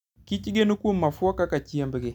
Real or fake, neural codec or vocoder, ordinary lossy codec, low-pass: real; none; none; 19.8 kHz